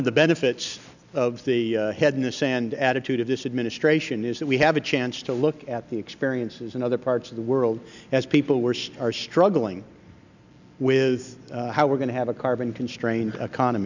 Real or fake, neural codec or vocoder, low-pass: real; none; 7.2 kHz